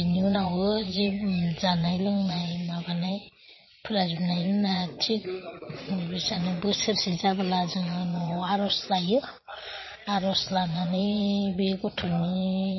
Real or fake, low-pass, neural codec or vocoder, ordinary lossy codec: fake; 7.2 kHz; vocoder, 22.05 kHz, 80 mel bands, Vocos; MP3, 24 kbps